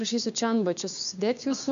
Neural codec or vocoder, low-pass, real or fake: codec, 16 kHz, 6 kbps, DAC; 7.2 kHz; fake